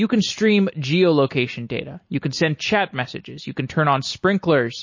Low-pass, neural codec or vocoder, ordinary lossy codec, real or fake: 7.2 kHz; none; MP3, 32 kbps; real